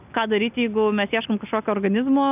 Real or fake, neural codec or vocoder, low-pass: real; none; 3.6 kHz